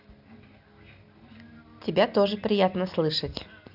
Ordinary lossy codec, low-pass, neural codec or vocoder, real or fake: none; 5.4 kHz; none; real